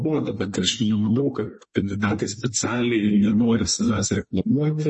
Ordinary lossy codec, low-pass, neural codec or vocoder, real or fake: MP3, 32 kbps; 10.8 kHz; codec, 24 kHz, 1 kbps, SNAC; fake